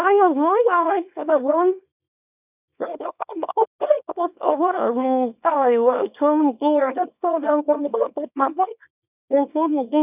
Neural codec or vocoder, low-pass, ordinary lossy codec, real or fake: codec, 24 kHz, 0.9 kbps, WavTokenizer, small release; 3.6 kHz; none; fake